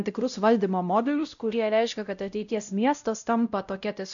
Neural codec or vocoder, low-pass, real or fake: codec, 16 kHz, 0.5 kbps, X-Codec, WavLM features, trained on Multilingual LibriSpeech; 7.2 kHz; fake